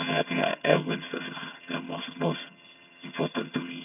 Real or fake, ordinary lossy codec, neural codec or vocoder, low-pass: fake; none; vocoder, 22.05 kHz, 80 mel bands, HiFi-GAN; 3.6 kHz